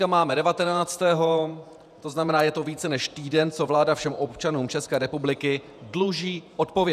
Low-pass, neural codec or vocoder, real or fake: 14.4 kHz; vocoder, 48 kHz, 128 mel bands, Vocos; fake